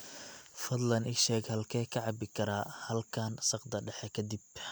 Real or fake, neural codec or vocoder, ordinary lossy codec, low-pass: real; none; none; none